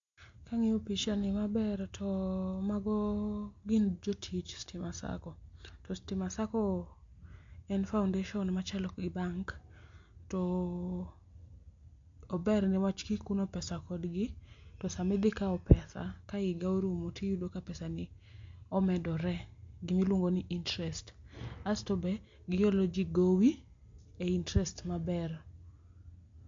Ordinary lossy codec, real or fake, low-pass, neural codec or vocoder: MP3, 48 kbps; real; 7.2 kHz; none